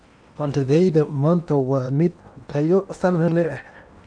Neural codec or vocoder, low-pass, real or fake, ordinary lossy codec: codec, 16 kHz in and 24 kHz out, 0.8 kbps, FocalCodec, streaming, 65536 codes; 9.9 kHz; fake; none